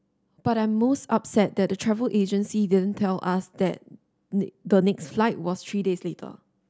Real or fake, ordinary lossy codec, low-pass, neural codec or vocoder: real; none; none; none